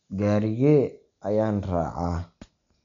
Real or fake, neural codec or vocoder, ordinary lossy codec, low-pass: real; none; none; 7.2 kHz